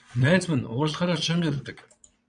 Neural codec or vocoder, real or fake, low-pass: vocoder, 22.05 kHz, 80 mel bands, Vocos; fake; 9.9 kHz